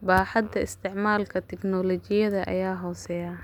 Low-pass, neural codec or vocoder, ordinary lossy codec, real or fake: 19.8 kHz; none; none; real